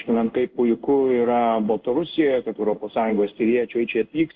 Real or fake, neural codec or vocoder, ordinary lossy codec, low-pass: fake; codec, 16 kHz in and 24 kHz out, 1 kbps, XY-Tokenizer; Opus, 32 kbps; 7.2 kHz